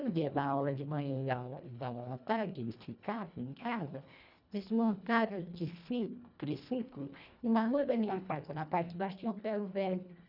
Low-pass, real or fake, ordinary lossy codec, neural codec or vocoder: 5.4 kHz; fake; none; codec, 24 kHz, 1.5 kbps, HILCodec